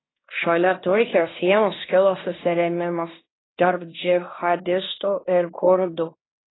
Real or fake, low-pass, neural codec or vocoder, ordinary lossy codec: fake; 7.2 kHz; codec, 16 kHz in and 24 kHz out, 0.9 kbps, LongCat-Audio-Codec, fine tuned four codebook decoder; AAC, 16 kbps